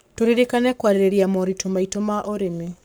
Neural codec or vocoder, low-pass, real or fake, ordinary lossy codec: codec, 44.1 kHz, 7.8 kbps, Pupu-Codec; none; fake; none